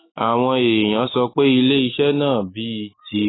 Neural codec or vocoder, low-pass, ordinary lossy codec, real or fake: autoencoder, 48 kHz, 128 numbers a frame, DAC-VAE, trained on Japanese speech; 7.2 kHz; AAC, 16 kbps; fake